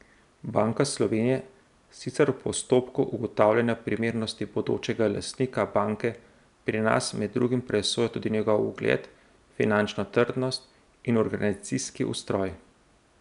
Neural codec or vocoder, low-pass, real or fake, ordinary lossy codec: none; 10.8 kHz; real; none